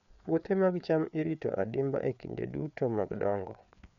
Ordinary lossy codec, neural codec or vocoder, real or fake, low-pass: none; codec, 16 kHz, 4 kbps, FreqCodec, larger model; fake; 7.2 kHz